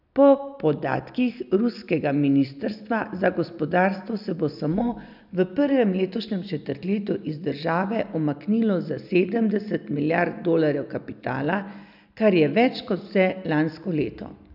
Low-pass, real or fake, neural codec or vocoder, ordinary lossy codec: 5.4 kHz; real; none; none